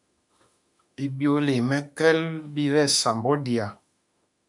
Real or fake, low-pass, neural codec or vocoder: fake; 10.8 kHz; autoencoder, 48 kHz, 32 numbers a frame, DAC-VAE, trained on Japanese speech